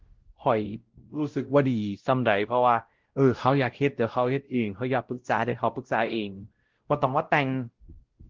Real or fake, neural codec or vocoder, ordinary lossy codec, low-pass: fake; codec, 16 kHz, 0.5 kbps, X-Codec, WavLM features, trained on Multilingual LibriSpeech; Opus, 16 kbps; 7.2 kHz